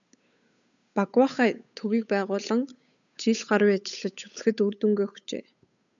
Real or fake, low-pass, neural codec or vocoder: fake; 7.2 kHz; codec, 16 kHz, 8 kbps, FunCodec, trained on Chinese and English, 25 frames a second